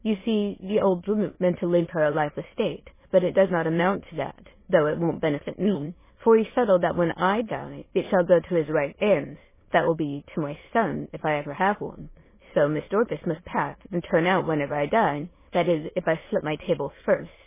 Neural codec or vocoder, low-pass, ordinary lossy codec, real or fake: autoencoder, 22.05 kHz, a latent of 192 numbers a frame, VITS, trained on many speakers; 3.6 kHz; MP3, 16 kbps; fake